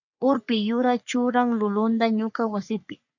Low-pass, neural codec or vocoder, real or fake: 7.2 kHz; codec, 44.1 kHz, 3.4 kbps, Pupu-Codec; fake